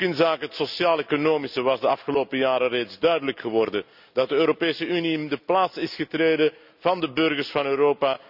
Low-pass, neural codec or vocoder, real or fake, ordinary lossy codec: 5.4 kHz; none; real; none